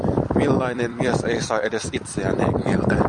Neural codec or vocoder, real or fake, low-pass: vocoder, 44.1 kHz, 128 mel bands every 256 samples, BigVGAN v2; fake; 10.8 kHz